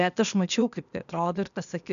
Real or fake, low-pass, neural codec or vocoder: fake; 7.2 kHz; codec, 16 kHz, 0.8 kbps, ZipCodec